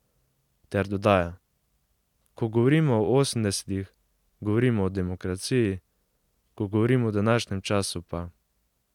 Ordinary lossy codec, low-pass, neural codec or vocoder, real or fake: none; 19.8 kHz; none; real